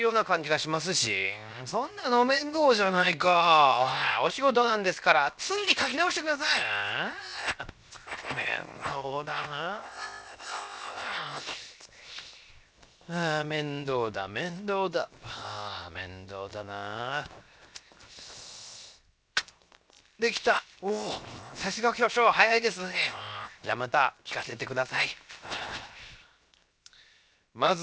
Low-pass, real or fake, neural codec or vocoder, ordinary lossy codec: none; fake; codec, 16 kHz, 0.7 kbps, FocalCodec; none